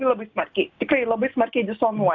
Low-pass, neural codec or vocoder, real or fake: 7.2 kHz; none; real